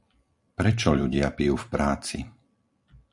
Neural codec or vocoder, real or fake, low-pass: vocoder, 44.1 kHz, 128 mel bands every 256 samples, BigVGAN v2; fake; 10.8 kHz